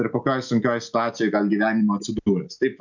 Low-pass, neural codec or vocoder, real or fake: 7.2 kHz; none; real